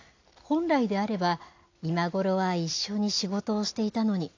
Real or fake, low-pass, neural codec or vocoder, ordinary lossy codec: real; 7.2 kHz; none; AAC, 48 kbps